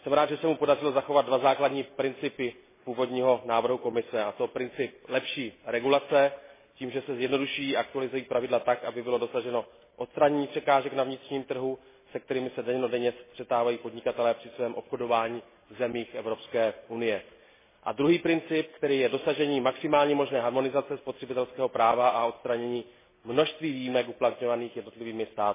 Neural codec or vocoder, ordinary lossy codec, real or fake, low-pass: none; MP3, 16 kbps; real; 3.6 kHz